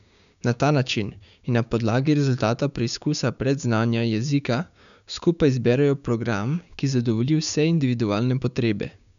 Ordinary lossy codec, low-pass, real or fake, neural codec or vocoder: none; 7.2 kHz; fake; codec, 16 kHz, 6 kbps, DAC